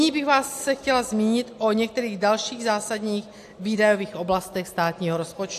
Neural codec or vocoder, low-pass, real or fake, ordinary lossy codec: none; 14.4 kHz; real; AAC, 64 kbps